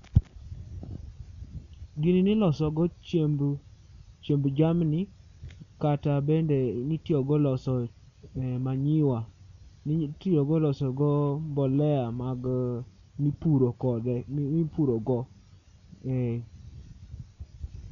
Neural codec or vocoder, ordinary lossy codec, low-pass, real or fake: none; none; 7.2 kHz; real